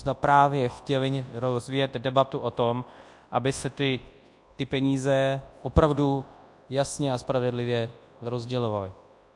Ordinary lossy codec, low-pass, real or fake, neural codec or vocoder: AAC, 64 kbps; 10.8 kHz; fake; codec, 24 kHz, 0.9 kbps, WavTokenizer, large speech release